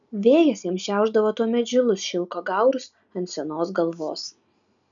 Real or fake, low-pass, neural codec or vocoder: real; 7.2 kHz; none